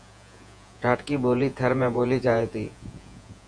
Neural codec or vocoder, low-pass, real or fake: vocoder, 48 kHz, 128 mel bands, Vocos; 9.9 kHz; fake